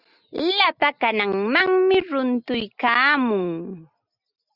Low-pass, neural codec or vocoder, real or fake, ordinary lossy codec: 5.4 kHz; none; real; Opus, 64 kbps